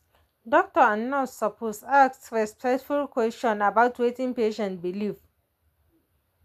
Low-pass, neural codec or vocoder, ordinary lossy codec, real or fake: 14.4 kHz; none; none; real